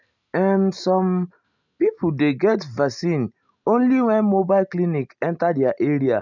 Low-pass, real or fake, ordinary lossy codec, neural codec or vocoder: 7.2 kHz; real; none; none